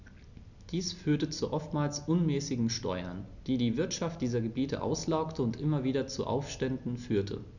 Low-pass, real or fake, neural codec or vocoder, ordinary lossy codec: 7.2 kHz; real; none; none